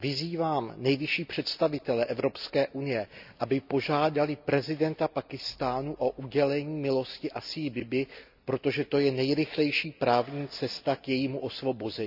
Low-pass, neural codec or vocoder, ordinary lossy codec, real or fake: 5.4 kHz; none; none; real